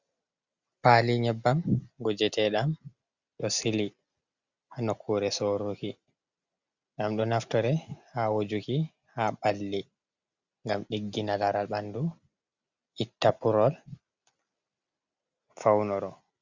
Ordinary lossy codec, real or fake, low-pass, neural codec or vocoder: Opus, 64 kbps; real; 7.2 kHz; none